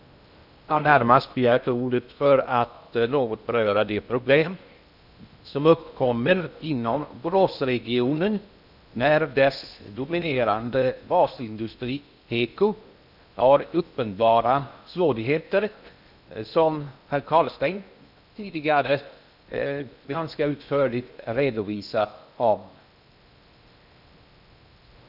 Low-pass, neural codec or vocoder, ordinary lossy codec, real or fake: 5.4 kHz; codec, 16 kHz in and 24 kHz out, 0.6 kbps, FocalCodec, streaming, 2048 codes; none; fake